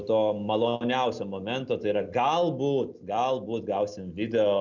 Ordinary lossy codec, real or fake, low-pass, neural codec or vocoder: Opus, 64 kbps; real; 7.2 kHz; none